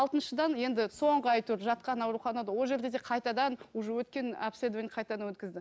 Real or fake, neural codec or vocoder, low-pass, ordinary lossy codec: real; none; none; none